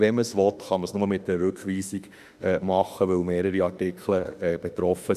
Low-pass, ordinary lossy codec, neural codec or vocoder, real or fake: 14.4 kHz; none; autoencoder, 48 kHz, 32 numbers a frame, DAC-VAE, trained on Japanese speech; fake